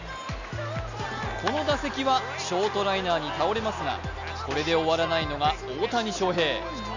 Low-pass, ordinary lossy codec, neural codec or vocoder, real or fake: 7.2 kHz; none; none; real